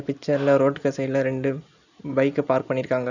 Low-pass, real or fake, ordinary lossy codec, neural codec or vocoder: 7.2 kHz; real; none; none